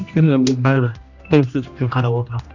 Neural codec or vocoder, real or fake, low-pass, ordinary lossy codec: codec, 16 kHz, 1 kbps, X-Codec, HuBERT features, trained on general audio; fake; 7.2 kHz; none